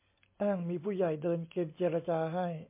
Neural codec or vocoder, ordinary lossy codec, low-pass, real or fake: none; MP3, 24 kbps; 3.6 kHz; real